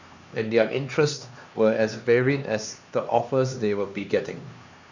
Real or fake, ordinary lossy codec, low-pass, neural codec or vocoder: fake; none; 7.2 kHz; codec, 16 kHz, 2 kbps, X-Codec, HuBERT features, trained on LibriSpeech